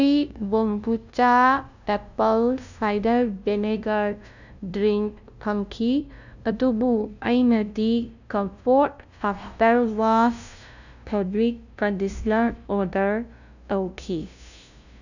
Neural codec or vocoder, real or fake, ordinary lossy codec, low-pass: codec, 16 kHz, 0.5 kbps, FunCodec, trained on LibriTTS, 25 frames a second; fake; none; 7.2 kHz